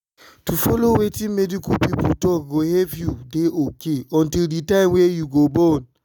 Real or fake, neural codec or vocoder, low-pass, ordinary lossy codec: real; none; none; none